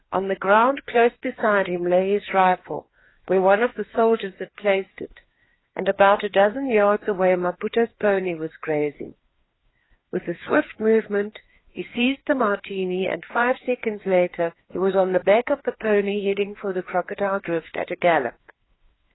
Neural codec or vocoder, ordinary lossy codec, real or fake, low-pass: codec, 16 kHz, 2 kbps, FreqCodec, larger model; AAC, 16 kbps; fake; 7.2 kHz